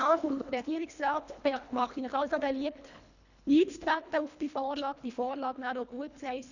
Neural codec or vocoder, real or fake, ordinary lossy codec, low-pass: codec, 24 kHz, 1.5 kbps, HILCodec; fake; none; 7.2 kHz